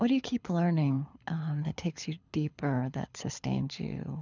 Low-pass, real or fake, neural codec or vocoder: 7.2 kHz; fake; codec, 24 kHz, 6 kbps, HILCodec